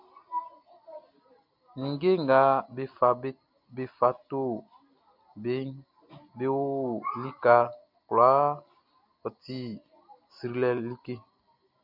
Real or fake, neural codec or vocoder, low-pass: real; none; 5.4 kHz